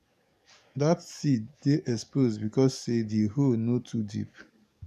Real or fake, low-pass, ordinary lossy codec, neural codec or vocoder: fake; 14.4 kHz; none; codec, 44.1 kHz, 7.8 kbps, DAC